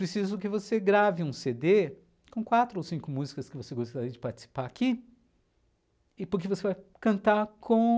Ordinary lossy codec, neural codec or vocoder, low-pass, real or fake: none; none; none; real